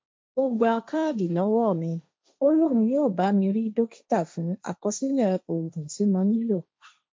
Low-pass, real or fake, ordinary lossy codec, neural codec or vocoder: none; fake; none; codec, 16 kHz, 1.1 kbps, Voila-Tokenizer